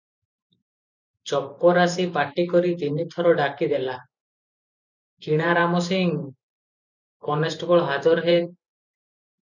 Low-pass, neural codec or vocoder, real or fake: 7.2 kHz; vocoder, 44.1 kHz, 128 mel bands every 512 samples, BigVGAN v2; fake